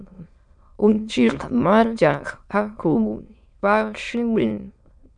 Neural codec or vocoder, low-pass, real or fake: autoencoder, 22.05 kHz, a latent of 192 numbers a frame, VITS, trained on many speakers; 9.9 kHz; fake